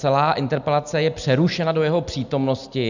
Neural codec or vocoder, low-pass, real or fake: none; 7.2 kHz; real